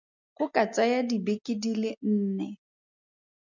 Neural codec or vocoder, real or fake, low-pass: none; real; 7.2 kHz